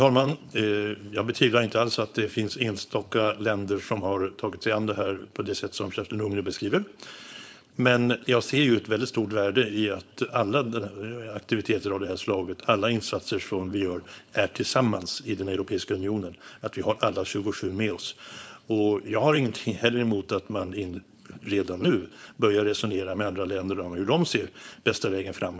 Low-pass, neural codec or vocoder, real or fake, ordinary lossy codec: none; codec, 16 kHz, 4.8 kbps, FACodec; fake; none